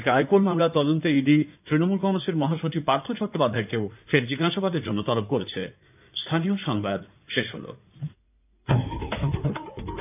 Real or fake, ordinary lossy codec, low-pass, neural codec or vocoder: fake; none; 3.6 kHz; codec, 16 kHz in and 24 kHz out, 2.2 kbps, FireRedTTS-2 codec